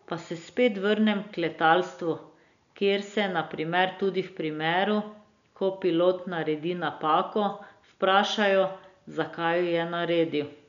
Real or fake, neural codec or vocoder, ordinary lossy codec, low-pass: real; none; none; 7.2 kHz